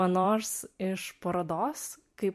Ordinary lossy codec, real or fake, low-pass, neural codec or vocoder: MP3, 64 kbps; fake; 14.4 kHz; vocoder, 44.1 kHz, 128 mel bands every 256 samples, BigVGAN v2